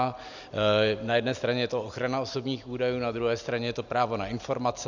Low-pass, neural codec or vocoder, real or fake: 7.2 kHz; none; real